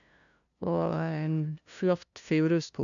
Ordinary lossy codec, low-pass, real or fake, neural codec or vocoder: none; 7.2 kHz; fake; codec, 16 kHz, 0.5 kbps, FunCodec, trained on LibriTTS, 25 frames a second